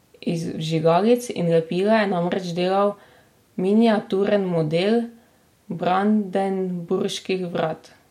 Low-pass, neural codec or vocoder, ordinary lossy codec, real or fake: 19.8 kHz; none; MP3, 64 kbps; real